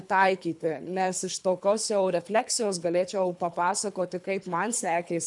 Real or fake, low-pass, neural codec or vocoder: fake; 10.8 kHz; codec, 24 kHz, 3 kbps, HILCodec